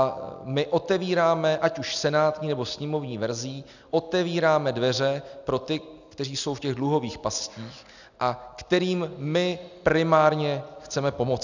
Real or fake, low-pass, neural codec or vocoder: real; 7.2 kHz; none